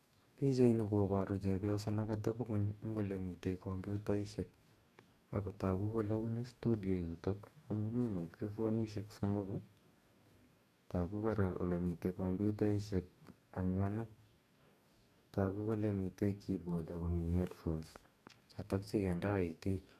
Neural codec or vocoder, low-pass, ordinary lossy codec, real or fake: codec, 44.1 kHz, 2.6 kbps, DAC; 14.4 kHz; none; fake